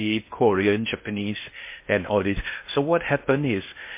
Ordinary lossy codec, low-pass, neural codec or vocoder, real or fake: MP3, 24 kbps; 3.6 kHz; codec, 16 kHz in and 24 kHz out, 0.6 kbps, FocalCodec, streaming, 2048 codes; fake